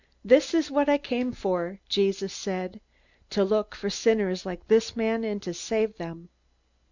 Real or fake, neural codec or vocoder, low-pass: real; none; 7.2 kHz